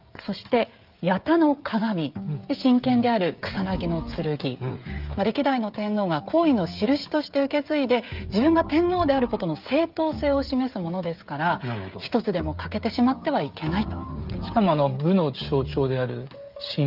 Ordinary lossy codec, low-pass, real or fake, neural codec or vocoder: Opus, 24 kbps; 5.4 kHz; fake; codec, 16 kHz, 16 kbps, FreqCodec, smaller model